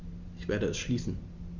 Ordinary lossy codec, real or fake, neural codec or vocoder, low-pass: Opus, 64 kbps; real; none; 7.2 kHz